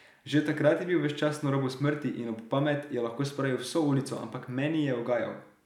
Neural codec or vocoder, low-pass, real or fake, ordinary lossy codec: none; 19.8 kHz; real; none